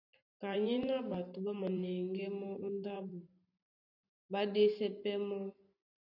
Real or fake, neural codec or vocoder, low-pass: fake; vocoder, 44.1 kHz, 128 mel bands every 512 samples, BigVGAN v2; 5.4 kHz